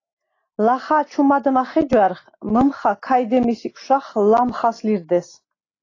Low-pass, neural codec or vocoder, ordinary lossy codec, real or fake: 7.2 kHz; none; AAC, 32 kbps; real